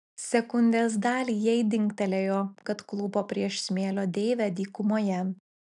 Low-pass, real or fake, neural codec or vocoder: 10.8 kHz; real; none